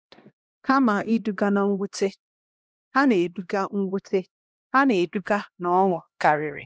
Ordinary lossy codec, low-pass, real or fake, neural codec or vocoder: none; none; fake; codec, 16 kHz, 1 kbps, X-Codec, HuBERT features, trained on LibriSpeech